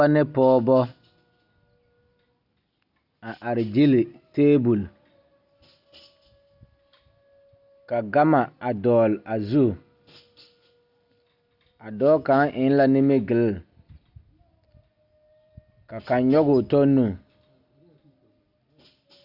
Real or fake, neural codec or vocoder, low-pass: real; none; 5.4 kHz